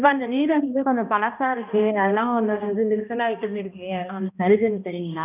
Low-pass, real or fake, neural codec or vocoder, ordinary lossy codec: 3.6 kHz; fake; codec, 16 kHz, 1 kbps, X-Codec, HuBERT features, trained on balanced general audio; none